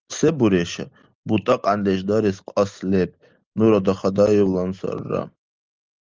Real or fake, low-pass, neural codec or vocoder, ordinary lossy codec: real; 7.2 kHz; none; Opus, 24 kbps